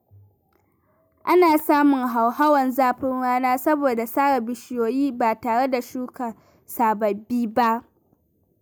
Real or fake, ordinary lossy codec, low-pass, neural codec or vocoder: real; none; none; none